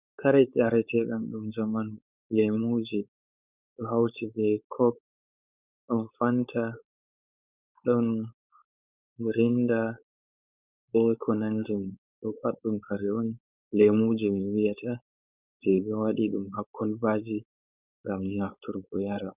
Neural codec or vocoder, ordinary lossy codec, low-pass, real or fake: codec, 16 kHz, 4.8 kbps, FACodec; Opus, 64 kbps; 3.6 kHz; fake